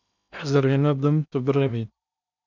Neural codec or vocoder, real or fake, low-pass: codec, 16 kHz in and 24 kHz out, 0.8 kbps, FocalCodec, streaming, 65536 codes; fake; 7.2 kHz